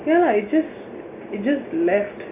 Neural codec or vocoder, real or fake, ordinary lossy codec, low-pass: none; real; MP3, 24 kbps; 3.6 kHz